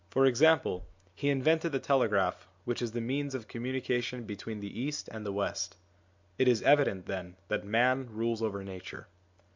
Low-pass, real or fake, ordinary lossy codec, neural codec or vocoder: 7.2 kHz; real; MP3, 64 kbps; none